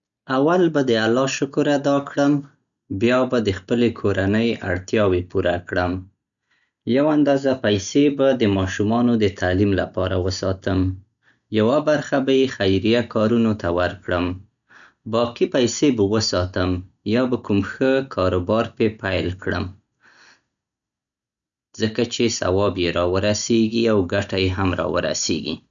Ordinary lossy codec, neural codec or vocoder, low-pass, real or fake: none; none; 7.2 kHz; real